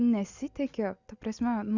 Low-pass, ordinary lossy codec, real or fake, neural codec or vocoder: 7.2 kHz; Opus, 64 kbps; real; none